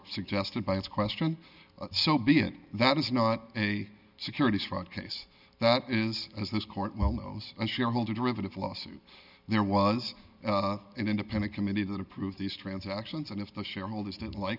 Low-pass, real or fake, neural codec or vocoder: 5.4 kHz; real; none